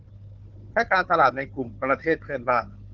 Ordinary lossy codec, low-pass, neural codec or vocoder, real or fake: Opus, 32 kbps; 7.2 kHz; codec, 16 kHz, 4 kbps, FunCodec, trained on Chinese and English, 50 frames a second; fake